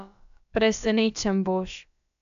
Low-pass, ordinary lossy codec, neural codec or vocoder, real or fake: 7.2 kHz; none; codec, 16 kHz, about 1 kbps, DyCAST, with the encoder's durations; fake